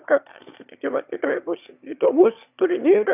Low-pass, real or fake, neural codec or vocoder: 3.6 kHz; fake; autoencoder, 22.05 kHz, a latent of 192 numbers a frame, VITS, trained on one speaker